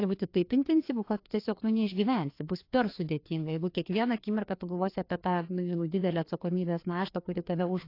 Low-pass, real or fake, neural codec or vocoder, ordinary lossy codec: 5.4 kHz; fake; codec, 16 kHz, 2 kbps, FreqCodec, larger model; AAC, 32 kbps